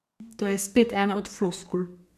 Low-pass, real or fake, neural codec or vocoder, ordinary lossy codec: 14.4 kHz; fake; codec, 32 kHz, 1.9 kbps, SNAC; none